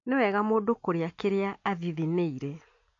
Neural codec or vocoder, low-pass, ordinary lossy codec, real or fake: none; 7.2 kHz; AAC, 48 kbps; real